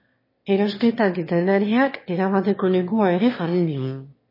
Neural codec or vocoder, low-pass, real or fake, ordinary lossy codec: autoencoder, 22.05 kHz, a latent of 192 numbers a frame, VITS, trained on one speaker; 5.4 kHz; fake; MP3, 24 kbps